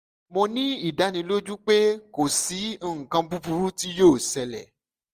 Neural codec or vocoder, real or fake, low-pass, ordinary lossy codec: none; real; 14.4 kHz; Opus, 24 kbps